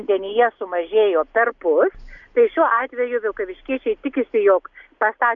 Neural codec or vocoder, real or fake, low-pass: none; real; 7.2 kHz